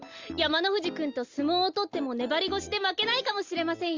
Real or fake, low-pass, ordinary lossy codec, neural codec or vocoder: real; 7.2 kHz; Opus, 32 kbps; none